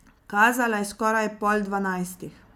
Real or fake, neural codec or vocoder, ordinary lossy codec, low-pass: real; none; none; 19.8 kHz